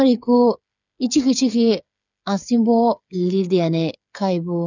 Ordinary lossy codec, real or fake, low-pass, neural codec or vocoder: none; fake; 7.2 kHz; codec, 16 kHz, 8 kbps, FreqCodec, smaller model